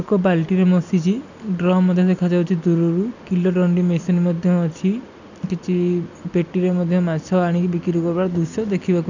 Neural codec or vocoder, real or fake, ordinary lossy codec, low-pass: none; real; none; 7.2 kHz